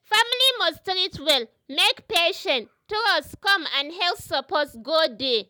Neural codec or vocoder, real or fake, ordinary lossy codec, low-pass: none; real; none; none